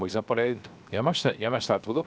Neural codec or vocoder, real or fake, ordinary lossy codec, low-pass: codec, 16 kHz, 0.7 kbps, FocalCodec; fake; none; none